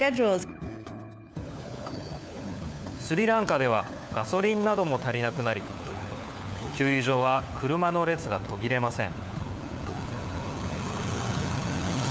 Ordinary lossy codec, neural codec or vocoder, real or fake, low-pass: none; codec, 16 kHz, 4 kbps, FunCodec, trained on LibriTTS, 50 frames a second; fake; none